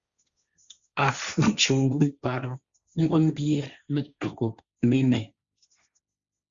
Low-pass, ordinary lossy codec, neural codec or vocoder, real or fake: 7.2 kHz; Opus, 64 kbps; codec, 16 kHz, 1.1 kbps, Voila-Tokenizer; fake